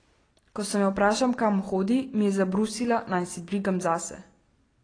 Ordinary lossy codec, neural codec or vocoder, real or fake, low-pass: AAC, 32 kbps; none; real; 9.9 kHz